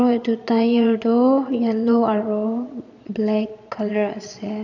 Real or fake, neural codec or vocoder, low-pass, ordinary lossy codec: fake; vocoder, 22.05 kHz, 80 mel bands, Vocos; 7.2 kHz; none